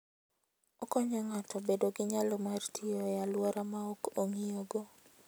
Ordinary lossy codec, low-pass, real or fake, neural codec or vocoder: none; none; real; none